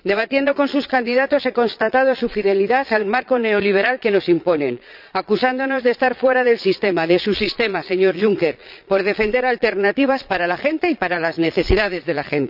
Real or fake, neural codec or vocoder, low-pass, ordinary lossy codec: fake; vocoder, 22.05 kHz, 80 mel bands, Vocos; 5.4 kHz; none